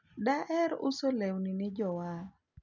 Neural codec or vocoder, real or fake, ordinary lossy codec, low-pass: none; real; none; 7.2 kHz